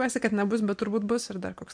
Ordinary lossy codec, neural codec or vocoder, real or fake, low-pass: MP3, 64 kbps; none; real; 9.9 kHz